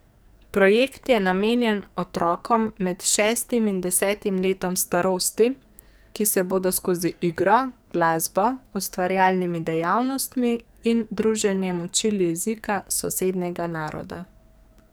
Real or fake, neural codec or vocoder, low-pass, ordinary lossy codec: fake; codec, 44.1 kHz, 2.6 kbps, SNAC; none; none